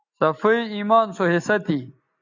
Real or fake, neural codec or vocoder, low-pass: real; none; 7.2 kHz